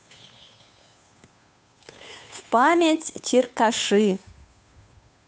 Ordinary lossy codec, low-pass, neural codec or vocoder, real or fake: none; none; codec, 16 kHz, 2 kbps, FunCodec, trained on Chinese and English, 25 frames a second; fake